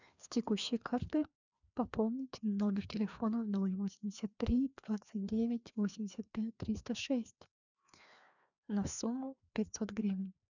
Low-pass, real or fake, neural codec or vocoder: 7.2 kHz; fake; codec, 16 kHz, 2 kbps, FreqCodec, larger model